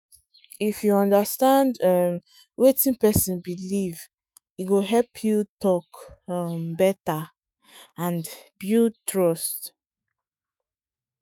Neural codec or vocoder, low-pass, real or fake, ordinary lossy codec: autoencoder, 48 kHz, 128 numbers a frame, DAC-VAE, trained on Japanese speech; none; fake; none